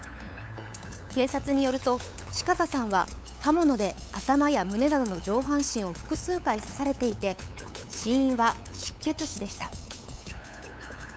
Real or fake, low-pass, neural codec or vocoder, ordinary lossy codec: fake; none; codec, 16 kHz, 8 kbps, FunCodec, trained on LibriTTS, 25 frames a second; none